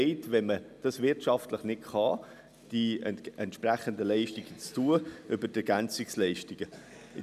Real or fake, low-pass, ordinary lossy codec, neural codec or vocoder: real; 14.4 kHz; none; none